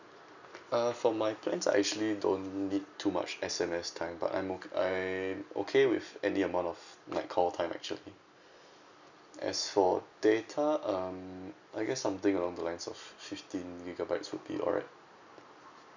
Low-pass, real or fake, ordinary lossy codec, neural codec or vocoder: 7.2 kHz; real; none; none